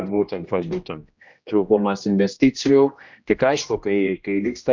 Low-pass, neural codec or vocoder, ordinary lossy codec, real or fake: 7.2 kHz; codec, 16 kHz, 1 kbps, X-Codec, HuBERT features, trained on general audio; MP3, 64 kbps; fake